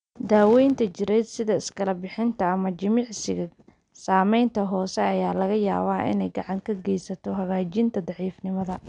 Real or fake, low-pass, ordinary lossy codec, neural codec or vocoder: real; 9.9 kHz; none; none